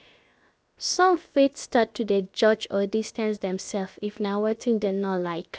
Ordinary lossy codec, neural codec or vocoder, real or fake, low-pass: none; codec, 16 kHz, 0.7 kbps, FocalCodec; fake; none